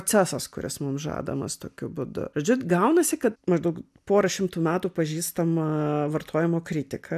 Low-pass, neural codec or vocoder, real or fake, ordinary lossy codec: 14.4 kHz; none; real; AAC, 96 kbps